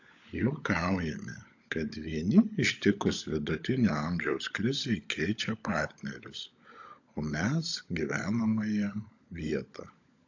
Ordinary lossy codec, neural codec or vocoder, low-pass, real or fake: AAC, 48 kbps; codec, 16 kHz, 16 kbps, FunCodec, trained on LibriTTS, 50 frames a second; 7.2 kHz; fake